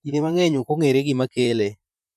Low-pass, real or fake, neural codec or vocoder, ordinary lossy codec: 14.4 kHz; fake; vocoder, 44.1 kHz, 128 mel bands, Pupu-Vocoder; none